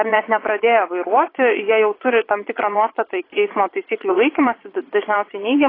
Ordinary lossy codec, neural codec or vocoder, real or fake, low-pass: AAC, 24 kbps; vocoder, 22.05 kHz, 80 mel bands, Vocos; fake; 5.4 kHz